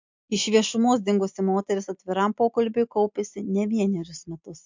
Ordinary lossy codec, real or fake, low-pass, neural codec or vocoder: MP3, 64 kbps; real; 7.2 kHz; none